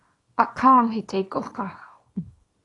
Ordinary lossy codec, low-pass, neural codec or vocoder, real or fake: AAC, 32 kbps; 10.8 kHz; codec, 24 kHz, 0.9 kbps, WavTokenizer, small release; fake